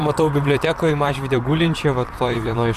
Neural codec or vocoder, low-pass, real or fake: vocoder, 44.1 kHz, 128 mel bands, Pupu-Vocoder; 14.4 kHz; fake